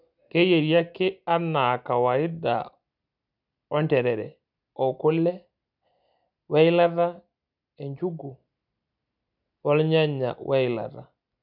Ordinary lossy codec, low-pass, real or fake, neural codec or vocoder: none; 5.4 kHz; real; none